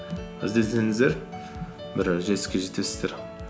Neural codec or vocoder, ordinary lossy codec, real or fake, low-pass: none; none; real; none